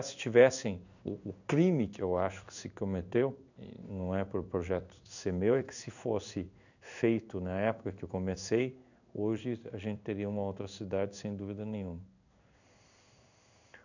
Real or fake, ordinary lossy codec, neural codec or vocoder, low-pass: fake; none; codec, 16 kHz in and 24 kHz out, 1 kbps, XY-Tokenizer; 7.2 kHz